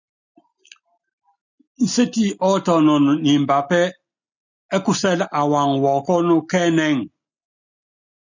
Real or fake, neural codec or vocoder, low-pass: real; none; 7.2 kHz